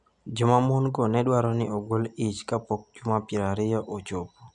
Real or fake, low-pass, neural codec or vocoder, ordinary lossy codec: real; 10.8 kHz; none; none